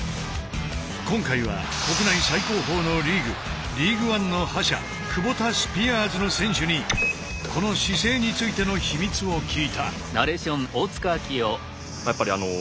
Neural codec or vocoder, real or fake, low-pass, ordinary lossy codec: none; real; none; none